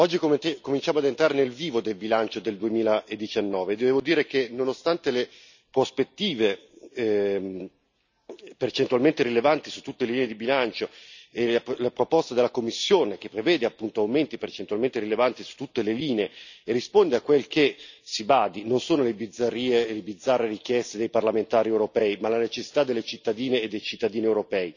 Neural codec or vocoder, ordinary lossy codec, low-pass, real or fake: none; none; 7.2 kHz; real